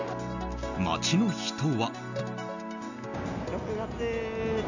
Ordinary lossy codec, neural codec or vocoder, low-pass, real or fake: none; none; 7.2 kHz; real